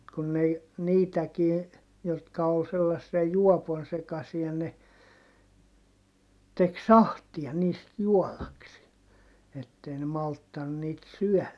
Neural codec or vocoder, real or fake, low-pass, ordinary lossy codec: none; real; none; none